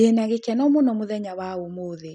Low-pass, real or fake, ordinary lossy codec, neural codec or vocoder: 10.8 kHz; real; none; none